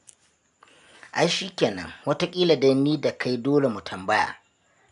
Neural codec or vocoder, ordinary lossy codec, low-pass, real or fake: none; none; 10.8 kHz; real